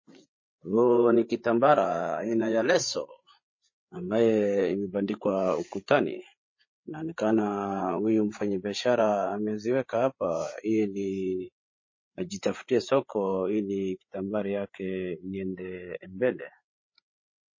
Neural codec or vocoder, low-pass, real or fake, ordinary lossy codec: codec, 16 kHz, 8 kbps, FreqCodec, larger model; 7.2 kHz; fake; MP3, 32 kbps